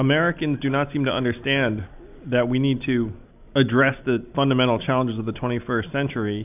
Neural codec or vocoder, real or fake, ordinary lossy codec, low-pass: none; real; AAC, 32 kbps; 3.6 kHz